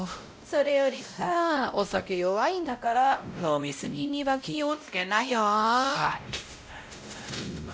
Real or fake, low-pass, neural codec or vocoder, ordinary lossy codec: fake; none; codec, 16 kHz, 0.5 kbps, X-Codec, WavLM features, trained on Multilingual LibriSpeech; none